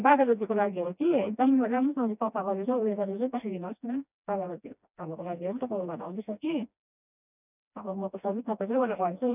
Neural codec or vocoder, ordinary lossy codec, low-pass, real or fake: codec, 16 kHz, 1 kbps, FreqCodec, smaller model; none; 3.6 kHz; fake